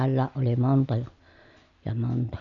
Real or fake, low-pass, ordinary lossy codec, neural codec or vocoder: real; 7.2 kHz; none; none